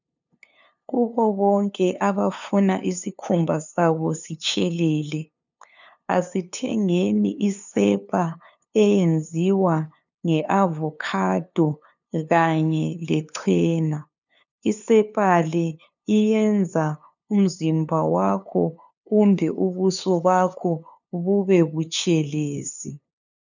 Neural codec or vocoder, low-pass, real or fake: codec, 16 kHz, 2 kbps, FunCodec, trained on LibriTTS, 25 frames a second; 7.2 kHz; fake